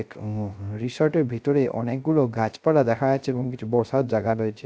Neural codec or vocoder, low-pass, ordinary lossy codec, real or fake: codec, 16 kHz, 0.3 kbps, FocalCodec; none; none; fake